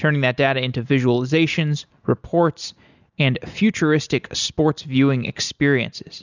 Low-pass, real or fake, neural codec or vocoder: 7.2 kHz; real; none